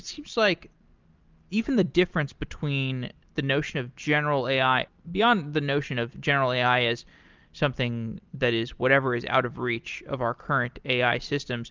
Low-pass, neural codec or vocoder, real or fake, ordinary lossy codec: 7.2 kHz; none; real; Opus, 24 kbps